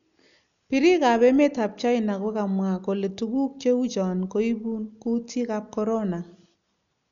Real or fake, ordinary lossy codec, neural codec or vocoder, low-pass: real; none; none; 7.2 kHz